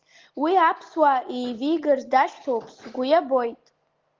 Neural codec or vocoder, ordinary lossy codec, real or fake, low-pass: none; Opus, 16 kbps; real; 7.2 kHz